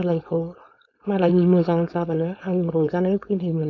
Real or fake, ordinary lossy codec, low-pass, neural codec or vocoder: fake; none; 7.2 kHz; codec, 16 kHz, 4.8 kbps, FACodec